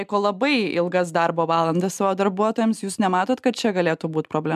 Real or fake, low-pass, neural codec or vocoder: real; 14.4 kHz; none